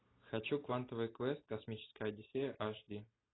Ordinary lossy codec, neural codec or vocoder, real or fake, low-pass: AAC, 16 kbps; none; real; 7.2 kHz